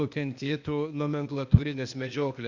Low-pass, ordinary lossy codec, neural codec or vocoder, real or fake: 7.2 kHz; Opus, 64 kbps; codec, 16 kHz, 0.8 kbps, ZipCodec; fake